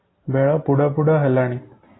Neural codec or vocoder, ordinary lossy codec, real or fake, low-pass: none; AAC, 16 kbps; real; 7.2 kHz